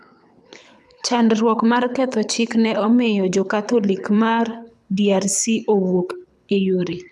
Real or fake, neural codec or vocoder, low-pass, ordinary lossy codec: fake; codec, 24 kHz, 6 kbps, HILCodec; none; none